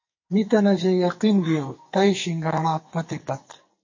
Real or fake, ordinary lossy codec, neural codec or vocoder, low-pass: fake; MP3, 32 kbps; codec, 44.1 kHz, 2.6 kbps, SNAC; 7.2 kHz